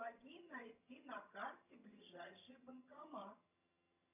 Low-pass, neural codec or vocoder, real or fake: 3.6 kHz; vocoder, 22.05 kHz, 80 mel bands, HiFi-GAN; fake